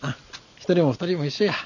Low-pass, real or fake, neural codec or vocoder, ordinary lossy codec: 7.2 kHz; real; none; none